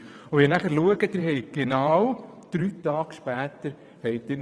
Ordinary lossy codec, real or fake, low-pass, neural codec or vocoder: none; fake; none; vocoder, 22.05 kHz, 80 mel bands, WaveNeXt